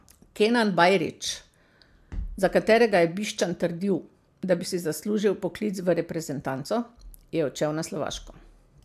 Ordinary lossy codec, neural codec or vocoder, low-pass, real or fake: AAC, 96 kbps; none; 14.4 kHz; real